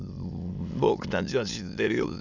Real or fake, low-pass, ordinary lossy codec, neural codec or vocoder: fake; 7.2 kHz; none; autoencoder, 22.05 kHz, a latent of 192 numbers a frame, VITS, trained on many speakers